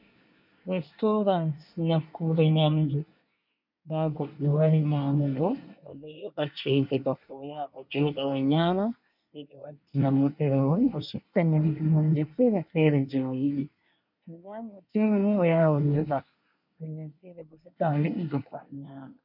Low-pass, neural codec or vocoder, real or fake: 5.4 kHz; codec, 24 kHz, 1 kbps, SNAC; fake